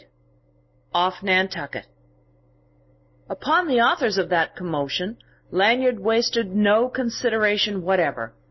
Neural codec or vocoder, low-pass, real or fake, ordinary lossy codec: none; 7.2 kHz; real; MP3, 24 kbps